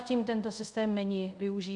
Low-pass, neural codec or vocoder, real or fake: 10.8 kHz; codec, 24 kHz, 0.5 kbps, DualCodec; fake